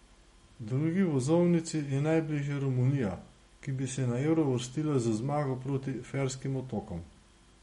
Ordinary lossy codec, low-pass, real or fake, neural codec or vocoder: MP3, 48 kbps; 14.4 kHz; real; none